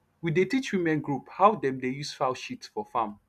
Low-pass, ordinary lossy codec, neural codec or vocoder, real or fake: 14.4 kHz; none; none; real